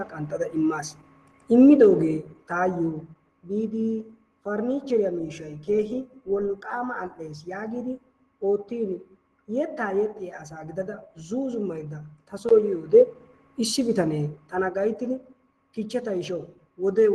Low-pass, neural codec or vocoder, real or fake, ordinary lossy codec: 10.8 kHz; none; real; Opus, 16 kbps